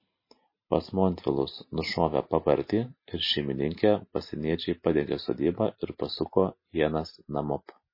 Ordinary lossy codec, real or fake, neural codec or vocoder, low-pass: MP3, 24 kbps; real; none; 5.4 kHz